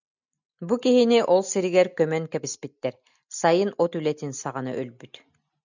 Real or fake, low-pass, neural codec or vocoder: real; 7.2 kHz; none